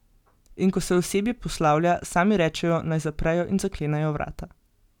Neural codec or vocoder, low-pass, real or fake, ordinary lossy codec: none; 19.8 kHz; real; none